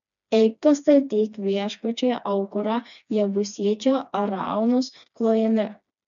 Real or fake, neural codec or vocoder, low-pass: fake; codec, 16 kHz, 2 kbps, FreqCodec, smaller model; 7.2 kHz